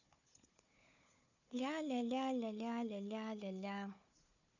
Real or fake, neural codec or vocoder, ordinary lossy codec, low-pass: fake; codec, 16 kHz, 4 kbps, FunCodec, trained on Chinese and English, 50 frames a second; none; 7.2 kHz